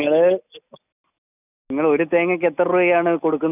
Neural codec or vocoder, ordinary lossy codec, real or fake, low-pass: none; none; real; 3.6 kHz